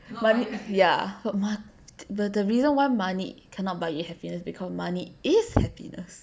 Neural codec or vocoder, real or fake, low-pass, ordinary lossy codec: none; real; none; none